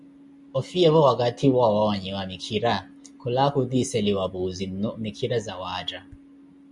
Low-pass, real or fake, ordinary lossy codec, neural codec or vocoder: 10.8 kHz; real; MP3, 64 kbps; none